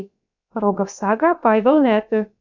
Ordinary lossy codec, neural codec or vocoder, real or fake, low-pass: MP3, 64 kbps; codec, 16 kHz, about 1 kbps, DyCAST, with the encoder's durations; fake; 7.2 kHz